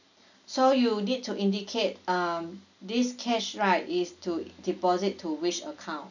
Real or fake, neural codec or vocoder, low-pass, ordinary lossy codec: real; none; 7.2 kHz; none